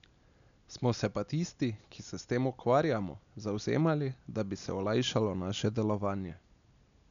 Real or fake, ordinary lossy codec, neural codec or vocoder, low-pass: real; none; none; 7.2 kHz